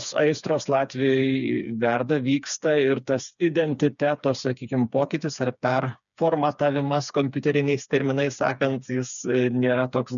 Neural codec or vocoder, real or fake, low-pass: codec, 16 kHz, 4 kbps, FreqCodec, smaller model; fake; 7.2 kHz